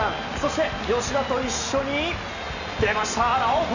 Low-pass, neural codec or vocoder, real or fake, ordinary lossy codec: 7.2 kHz; none; real; none